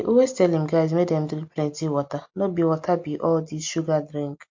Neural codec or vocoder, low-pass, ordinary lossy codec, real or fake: none; 7.2 kHz; MP3, 48 kbps; real